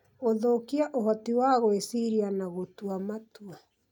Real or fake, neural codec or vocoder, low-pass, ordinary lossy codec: real; none; 19.8 kHz; none